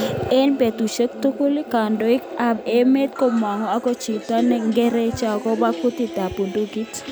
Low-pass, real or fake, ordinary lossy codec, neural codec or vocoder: none; real; none; none